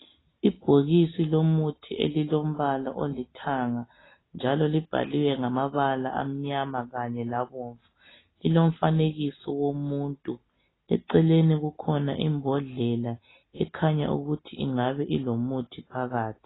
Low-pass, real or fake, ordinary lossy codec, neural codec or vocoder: 7.2 kHz; real; AAC, 16 kbps; none